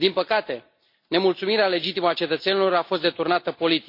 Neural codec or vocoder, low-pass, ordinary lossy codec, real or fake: none; 5.4 kHz; MP3, 32 kbps; real